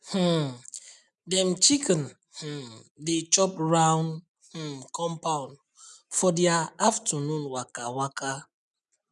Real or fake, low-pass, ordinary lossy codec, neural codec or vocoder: real; 10.8 kHz; none; none